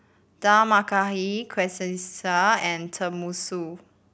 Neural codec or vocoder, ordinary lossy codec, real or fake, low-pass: none; none; real; none